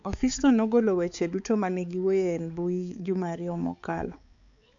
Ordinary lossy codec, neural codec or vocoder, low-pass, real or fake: MP3, 64 kbps; codec, 16 kHz, 4 kbps, X-Codec, HuBERT features, trained on balanced general audio; 7.2 kHz; fake